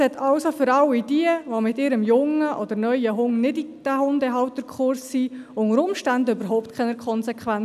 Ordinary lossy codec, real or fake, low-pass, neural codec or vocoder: none; real; 14.4 kHz; none